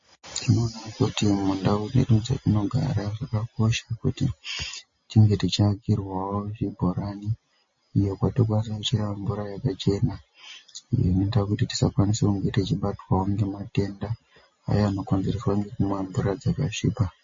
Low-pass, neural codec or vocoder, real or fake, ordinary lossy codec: 7.2 kHz; none; real; MP3, 32 kbps